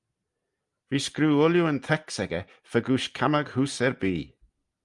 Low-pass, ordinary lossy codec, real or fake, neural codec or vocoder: 10.8 kHz; Opus, 32 kbps; real; none